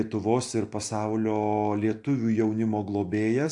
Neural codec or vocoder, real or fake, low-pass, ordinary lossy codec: vocoder, 44.1 kHz, 128 mel bands every 256 samples, BigVGAN v2; fake; 10.8 kHz; AAC, 64 kbps